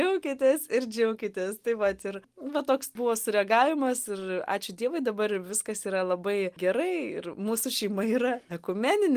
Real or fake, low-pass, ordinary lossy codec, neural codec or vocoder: real; 14.4 kHz; Opus, 24 kbps; none